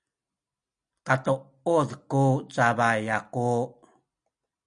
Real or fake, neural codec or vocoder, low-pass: real; none; 9.9 kHz